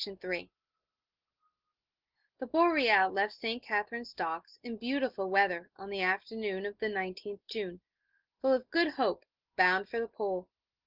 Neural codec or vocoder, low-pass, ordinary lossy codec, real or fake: none; 5.4 kHz; Opus, 16 kbps; real